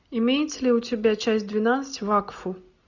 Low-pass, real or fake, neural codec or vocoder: 7.2 kHz; real; none